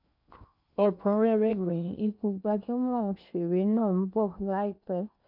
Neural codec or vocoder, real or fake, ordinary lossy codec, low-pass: codec, 16 kHz in and 24 kHz out, 0.8 kbps, FocalCodec, streaming, 65536 codes; fake; none; 5.4 kHz